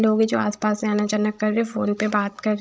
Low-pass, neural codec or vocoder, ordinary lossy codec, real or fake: none; codec, 16 kHz, 16 kbps, FreqCodec, larger model; none; fake